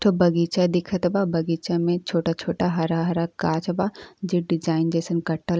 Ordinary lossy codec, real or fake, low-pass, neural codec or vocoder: none; real; none; none